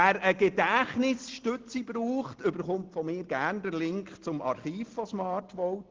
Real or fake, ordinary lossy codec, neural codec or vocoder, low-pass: real; Opus, 16 kbps; none; 7.2 kHz